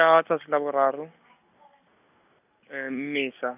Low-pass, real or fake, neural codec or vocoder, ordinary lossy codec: 3.6 kHz; real; none; none